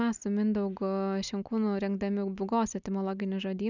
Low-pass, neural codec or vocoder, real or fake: 7.2 kHz; none; real